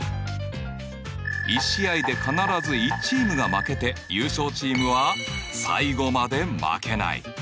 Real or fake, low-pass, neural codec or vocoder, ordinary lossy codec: real; none; none; none